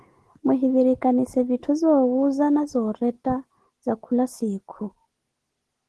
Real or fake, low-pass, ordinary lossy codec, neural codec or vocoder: real; 10.8 kHz; Opus, 16 kbps; none